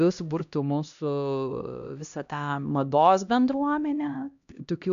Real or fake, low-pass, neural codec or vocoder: fake; 7.2 kHz; codec, 16 kHz, 1 kbps, X-Codec, HuBERT features, trained on LibriSpeech